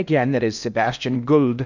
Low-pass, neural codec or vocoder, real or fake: 7.2 kHz; codec, 16 kHz in and 24 kHz out, 0.8 kbps, FocalCodec, streaming, 65536 codes; fake